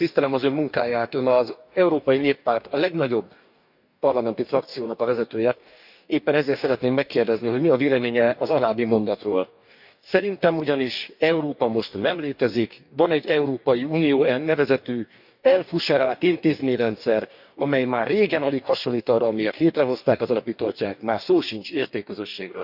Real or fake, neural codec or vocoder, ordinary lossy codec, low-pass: fake; codec, 44.1 kHz, 2.6 kbps, DAC; none; 5.4 kHz